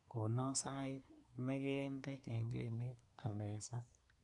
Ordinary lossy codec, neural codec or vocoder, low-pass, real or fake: none; codec, 24 kHz, 1 kbps, SNAC; 10.8 kHz; fake